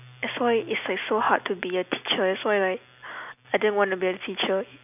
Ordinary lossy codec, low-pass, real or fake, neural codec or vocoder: none; 3.6 kHz; real; none